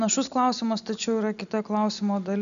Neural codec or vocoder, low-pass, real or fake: none; 7.2 kHz; real